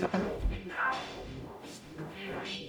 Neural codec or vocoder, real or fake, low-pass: codec, 44.1 kHz, 0.9 kbps, DAC; fake; 19.8 kHz